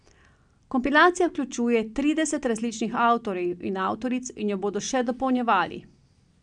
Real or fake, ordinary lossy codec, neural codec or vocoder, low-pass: real; none; none; 9.9 kHz